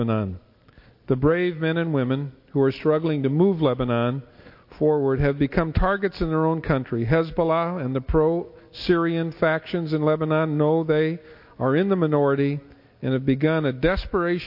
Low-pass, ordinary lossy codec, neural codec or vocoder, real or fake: 5.4 kHz; MP3, 32 kbps; none; real